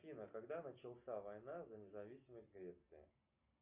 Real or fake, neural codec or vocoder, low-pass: real; none; 3.6 kHz